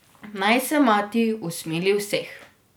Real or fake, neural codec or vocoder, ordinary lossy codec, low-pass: real; none; none; none